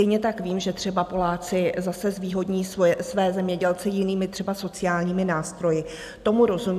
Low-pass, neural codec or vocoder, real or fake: 14.4 kHz; none; real